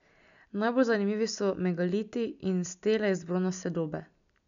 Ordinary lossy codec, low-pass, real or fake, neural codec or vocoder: none; 7.2 kHz; real; none